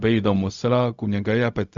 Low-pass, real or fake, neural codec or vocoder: 7.2 kHz; fake; codec, 16 kHz, 0.4 kbps, LongCat-Audio-Codec